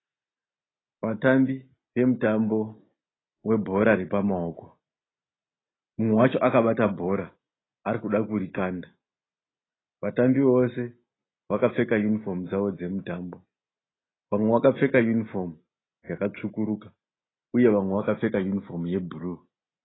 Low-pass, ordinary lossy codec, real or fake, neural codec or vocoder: 7.2 kHz; AAC, 16 kbps; real; none